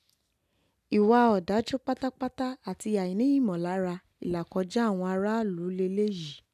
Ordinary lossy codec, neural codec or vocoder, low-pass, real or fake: none; none; 14.4 kHz; real